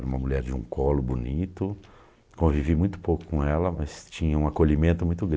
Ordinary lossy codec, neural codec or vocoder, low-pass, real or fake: none; none; none; real